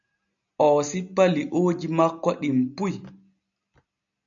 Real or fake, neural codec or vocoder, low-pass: real; none; 7.2 kHz